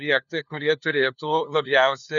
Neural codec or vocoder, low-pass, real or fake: codec, 16 kHz, 2 kbps, FunCodec, trained on LibriTTS, 25 frames a second; 7.2 kHz; fake